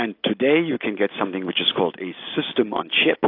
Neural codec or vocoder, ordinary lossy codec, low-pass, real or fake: none; AAC, 32 kbps; 5.4 kHz; real